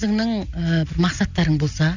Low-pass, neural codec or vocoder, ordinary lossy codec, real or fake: 7.2 kHz; none; none; real